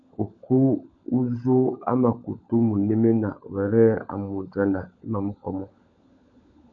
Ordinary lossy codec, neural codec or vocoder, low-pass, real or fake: AAC, 64 kbps; codec, 16 kHz, 16 kbps, FunCodec, trained on LibriTTS, 50 frames a second; 7.2 kHz; fake